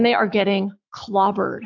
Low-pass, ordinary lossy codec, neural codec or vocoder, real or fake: 7.2 kHz; AAC, 48 kbps; autoencoder, 48 kHz, 128 numbers a frame, DAC-VAE, trained on Japanese speech; fake